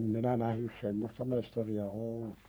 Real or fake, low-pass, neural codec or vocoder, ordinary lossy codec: fake; none; codec, 44.1 kHz, 3.4 kbps, Pupu-Codec; none